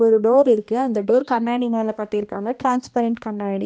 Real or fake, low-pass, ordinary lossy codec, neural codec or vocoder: fake; none; none; codec, 16 kHz, 1 kbps, X-Codec, HuBERT features, trained on balanced general audio